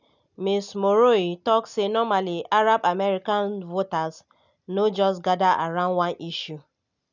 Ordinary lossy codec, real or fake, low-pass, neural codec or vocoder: none; real; 7.2 kHz; none